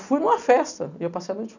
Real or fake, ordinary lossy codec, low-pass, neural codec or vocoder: real; none; 7.2 kHz; none